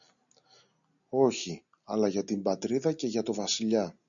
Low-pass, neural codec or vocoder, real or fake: 7.2 kHz; none; real